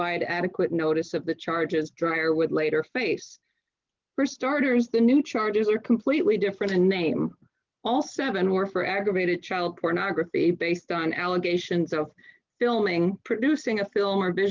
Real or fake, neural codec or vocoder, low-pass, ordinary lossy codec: real; none; 7.2 kHz; Opus, 32 kbps